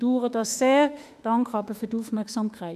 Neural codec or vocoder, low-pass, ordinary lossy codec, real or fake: autoencoder, 48 kHz, 32 numbers a frame, DAC-VAE, trained on Japanese speech; 14.4 kHz; none; fake